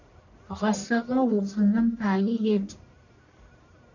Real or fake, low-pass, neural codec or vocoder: fake; 7.2 kHz; codec, 44.1 kHz, 1.7 kbps, Pupu-Codec